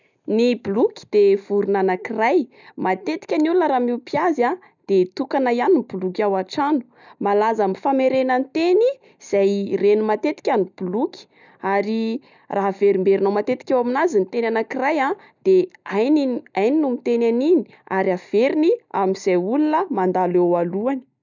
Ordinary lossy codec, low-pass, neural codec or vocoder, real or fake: none; 7.2 kHz; none; real